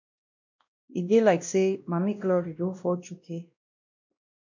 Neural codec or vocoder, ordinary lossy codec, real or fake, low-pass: codec, 16 kHz, 1 kbps, X-Codec, WavLM features, trained on Multilingual LibriSpeech; MP3, 48 kbps; fake; 7.2 kHz